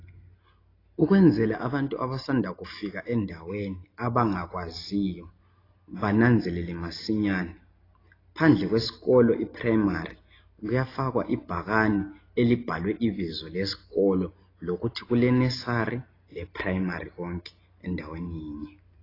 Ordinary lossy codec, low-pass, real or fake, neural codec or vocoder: AAC, 24 kbps; 5.4 kHz; real; none